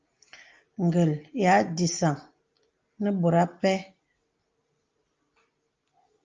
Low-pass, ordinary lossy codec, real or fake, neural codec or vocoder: 7.2 kHz; Opus, 24 kbps; real; none